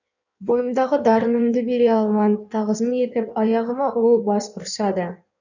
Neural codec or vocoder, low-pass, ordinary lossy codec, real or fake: codec, 16 kHz in and 24 kHz out, 1.1 kbps, FireRedTTS-2 codec; 7.2 kHz; none; fake